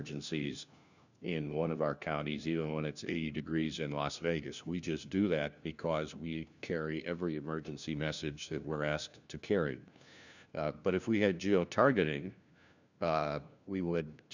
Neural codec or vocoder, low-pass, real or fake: codec, 16 kHz, 1 kbps, FunCodec, trained on LibriTTS, 50 frames a second; 7.2 kHz; fake